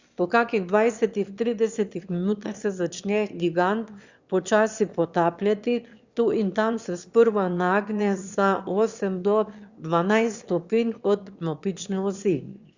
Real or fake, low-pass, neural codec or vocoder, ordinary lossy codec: fake; 7.2 kHz; autoencoder, 22.05 kHz, a latent of 192 numbers a frame, VITS, trained on one speaker; Opus, 64 kbps